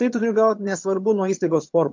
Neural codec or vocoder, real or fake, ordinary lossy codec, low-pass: vocoder, 22.05 kHz, 80 mel bands, HiFi-GAN; fake; MP3, 48 kbps; 7.2 kHz